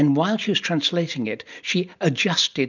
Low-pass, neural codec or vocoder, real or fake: 7.2 kHz; none; real